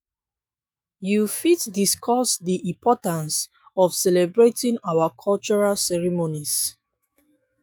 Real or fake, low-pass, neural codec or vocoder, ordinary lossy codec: fake; none; autoencoder, 48 kHz, 128 numbers a frame, DAC-VAE, trained on Japanese speech; none